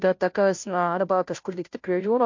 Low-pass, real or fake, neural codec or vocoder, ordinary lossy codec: 7.2 kHz; fake; codec, 16 kHz, 0.5 kbps, FunCodec, trained on Chinese and English, 25 frames a second; MP3, 48 kbps